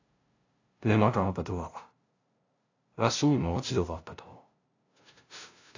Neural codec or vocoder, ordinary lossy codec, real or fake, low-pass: codec, 16 kHz, 0.5 kbps, FunCodec, trained on LibriTTS, 25 frames a second; AAC, 48 kbps; fake; 7.2 kHz